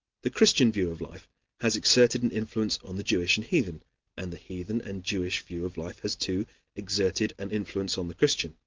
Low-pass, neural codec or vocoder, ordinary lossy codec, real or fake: 7.2 kHz; none; Opus, 32 kbps; real